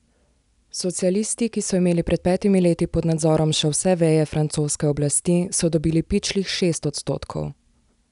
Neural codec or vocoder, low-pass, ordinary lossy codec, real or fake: none; 10.8 kHz; none; real